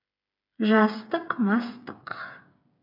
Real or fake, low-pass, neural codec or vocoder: fake; 5.4 kHz; codec, 16 kHz, 8 kbps, FreqCodec, smaller model